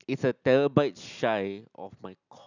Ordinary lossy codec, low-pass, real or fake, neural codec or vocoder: none; 7.2 kHz; real; none